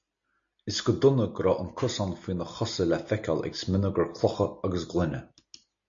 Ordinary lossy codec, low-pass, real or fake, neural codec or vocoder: MP3, 64 kbps; 7.2 kHz; real; none